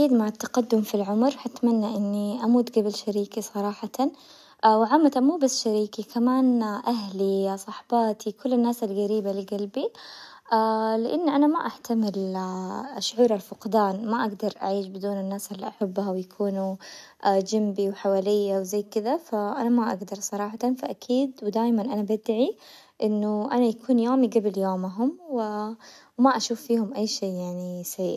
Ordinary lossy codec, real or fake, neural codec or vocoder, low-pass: none; real; none; 14.4 kHz